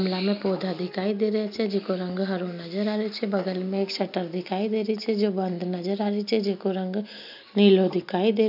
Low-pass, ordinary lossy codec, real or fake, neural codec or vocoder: 5.4 kHz; none; real; none